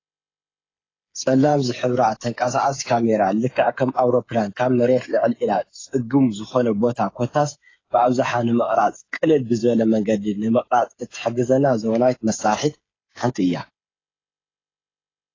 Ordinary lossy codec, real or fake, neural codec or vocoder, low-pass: AAC, 32 kbps; fake; codec, 16 kHz, 8 kbps, FreqCodec, smaller model; 7.2 kHz